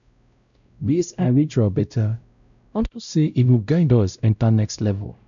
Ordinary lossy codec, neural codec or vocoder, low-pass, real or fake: none; codec, 16 kHz, 0.5 kbps, X-Codec, WavLM features, trained on Multilingual LibriSpeech; 7.2 kHz; fake